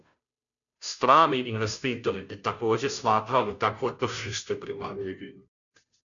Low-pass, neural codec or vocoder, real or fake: 7.2 kHz; codec, 16 kHz, 0.5 kbps, FunCodec, trained on Chinese and English, 25 frames a second; fake